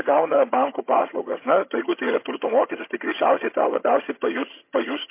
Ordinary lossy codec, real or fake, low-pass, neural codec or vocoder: MP3, 24 kbps; fake; 3.6 kHz; vocoder, 22.05 kHz, 80 mel bands, HiFi-GAN